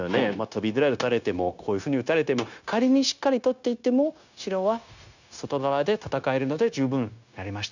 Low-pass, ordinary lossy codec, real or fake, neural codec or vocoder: 7.2 kHz; none; fake; codec, 16 kHz, 0.9 kbps, LongCat-Audio-Codec